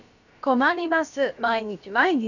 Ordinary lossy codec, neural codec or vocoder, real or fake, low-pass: none; codec, 16 kHz, about 1 kbps, DyCAST, with the encoder's durations; fake; 7.2 kHz